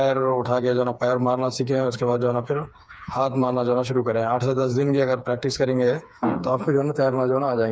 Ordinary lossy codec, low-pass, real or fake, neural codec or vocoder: none; none; fake; codec, 16 kHz, 4 kbps, FreqCodec, smaller model